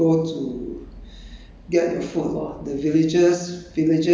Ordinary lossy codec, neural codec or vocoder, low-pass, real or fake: none; none; none; real